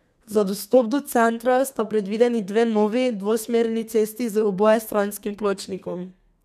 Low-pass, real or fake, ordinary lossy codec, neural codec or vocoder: 14.4 kHz; fake; none; codec, 32 kHz, 1.9 kbps, SNAC